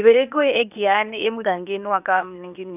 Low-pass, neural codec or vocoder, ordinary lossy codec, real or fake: 3.6 kHz; codec, 16 kHz, 0.8 kbps, ZipCodec; none; fake